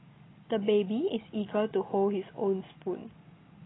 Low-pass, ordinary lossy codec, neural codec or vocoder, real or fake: 7.2 kHz; AAC, 16 kbps; none; real